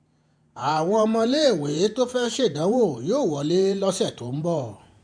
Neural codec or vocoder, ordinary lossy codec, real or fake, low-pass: vocoder, 48 kHz, 128 mel bands, Vocos; MP3, 96 kbps; fake; 9.9 kHz